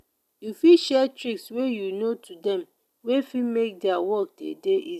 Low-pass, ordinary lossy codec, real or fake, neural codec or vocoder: 14.4 kHz; none; real; none